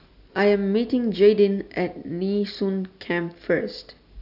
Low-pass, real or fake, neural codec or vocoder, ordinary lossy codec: 5.4 kHz; real; none; none